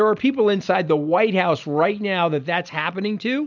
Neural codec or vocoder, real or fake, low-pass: none; real; 7.2 kHz